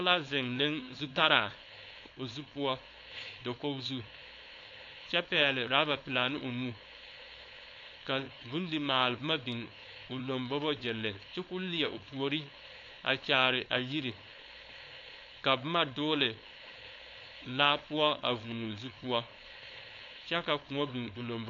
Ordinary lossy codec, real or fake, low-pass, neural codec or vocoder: AAC, 48 kbps; fake; 7.2 kHz; codec, 16 kHz, 4.8 kbps, FACodec